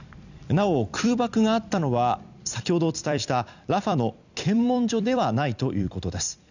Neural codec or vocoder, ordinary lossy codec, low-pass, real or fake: vocoder, 44.1 kHz, 128 mel bands every 256 samples, BigVGAN v2; none; 7.2 kHz; fake